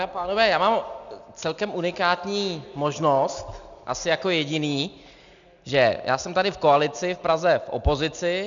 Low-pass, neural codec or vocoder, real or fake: 7.2 kHz; none; real